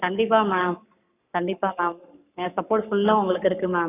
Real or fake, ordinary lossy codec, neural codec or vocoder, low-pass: fake; none; codec, 44.1 kHz, 7.8 kbps, Pupu-Codec; 3.6 kHz